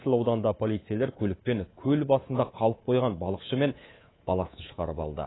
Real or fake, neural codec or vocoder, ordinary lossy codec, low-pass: fake; codec, 24 kHz, 3.1 kbps, DualCodec; AAC, 16 kbps; 7.2 kHz